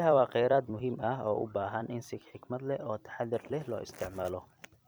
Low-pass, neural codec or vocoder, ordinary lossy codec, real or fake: none; vocoder, 44.1 kHz, 128 mel bands every 256 samples, BigVGAN v2; none; fake